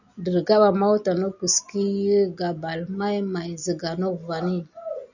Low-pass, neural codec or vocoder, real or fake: 7.2 kHz; none; real